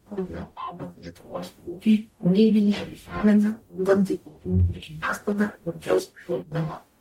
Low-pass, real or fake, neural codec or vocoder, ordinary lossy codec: 19.8 kHz; fake; codec, 44.1 kHz, 0.9 kbps, DAC; MP3, 64 kbps